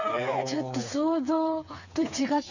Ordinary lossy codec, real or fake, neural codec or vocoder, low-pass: none; fake; codec, 16 kHz, 4 kbps, FreqCodec, smaller model; 7.2 kHz